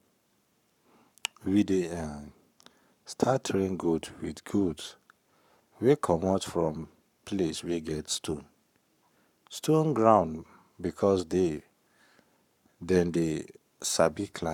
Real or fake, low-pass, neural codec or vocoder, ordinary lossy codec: fake; 19.8 kHz; codec, 44.1 kHz, 7.8 kbps, Pupu-Codec; none